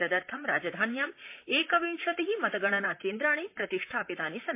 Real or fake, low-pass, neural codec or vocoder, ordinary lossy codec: fake; 3.6 kHz; vocoder, 44.1 kHz, 128 mel bands, Pupu-Vocoder; MP3, 24 kbps